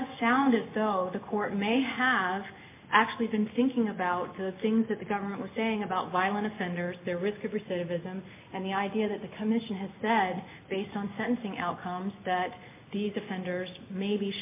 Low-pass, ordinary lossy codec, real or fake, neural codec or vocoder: 3.6 kHz; MP3, 24 kbps; real; none